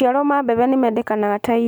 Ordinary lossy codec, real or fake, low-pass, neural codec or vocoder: none; real; none; none